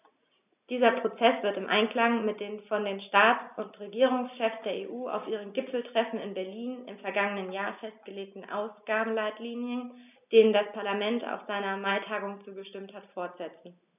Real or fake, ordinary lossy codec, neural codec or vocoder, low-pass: real; none; none; 3.6 kHz